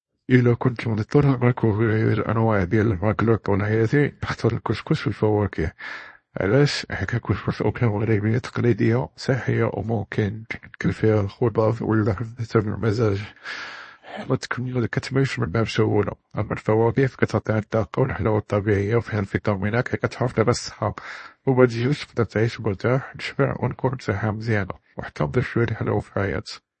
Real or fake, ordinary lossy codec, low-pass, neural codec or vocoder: fake; MP3, 32 kbps; 10.8 kHz; codec, 24 kHz, 0.9 kbps, WavTokenizer, small release